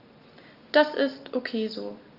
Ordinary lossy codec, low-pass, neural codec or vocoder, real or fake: Opus, 32 kbps; 5.4 kHz; none; real